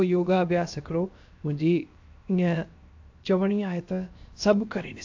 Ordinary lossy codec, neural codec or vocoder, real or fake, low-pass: AAC, 48 kbps; codec, 16 kHz, 0.7 kbps, FocalCodec; fake; 7.2 kHz